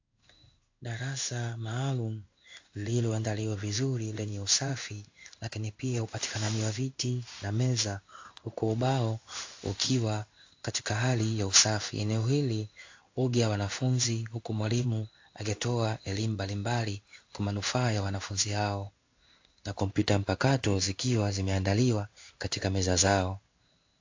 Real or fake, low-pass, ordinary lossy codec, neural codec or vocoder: fake; 7.2 kHz; AAC, 48 kbps; codec, 16 kHz in and 24 kHz out, 1 kbps, XY-Tokenizer